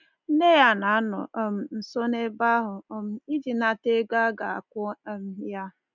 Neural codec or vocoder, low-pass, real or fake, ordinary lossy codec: none; 7.2 kHz; real; none